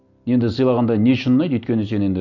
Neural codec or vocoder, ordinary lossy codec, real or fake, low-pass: none; none; real; 7.2 kHz